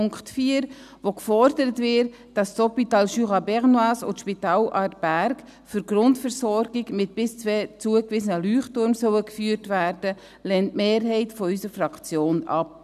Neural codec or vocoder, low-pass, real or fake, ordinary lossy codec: none; 14.4 kHz; real; none